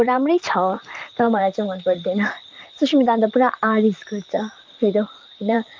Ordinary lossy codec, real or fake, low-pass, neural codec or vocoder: Opus, 24 kbps; fake; 7.2 kHz; vocoder, 44.1 kHz, 128 mel bands, Pupu-Vocoder